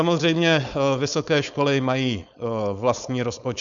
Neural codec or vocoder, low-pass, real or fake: codec, 16 kHz, 4.8 kbps, FACodec; 7.2 kHz; fake